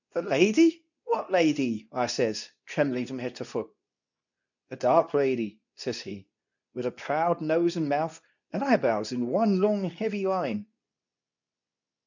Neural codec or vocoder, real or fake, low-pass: codec, 24 kHz, 0.9 kbps, WavTokenizer, medium speech release version 2; fake; 7.2 kHz